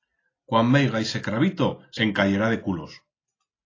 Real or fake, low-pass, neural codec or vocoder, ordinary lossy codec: real; 7.2 kHz; none; AAC, 32 kbps